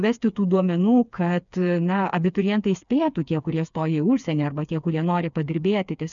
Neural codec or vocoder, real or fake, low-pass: codec, 16 kHz, 4 kbps, FreqCodec, smaller model; fake; 7.2 kHz